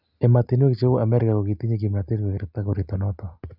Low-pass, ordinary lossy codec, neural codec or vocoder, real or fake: 5.4 kHz; none; none; real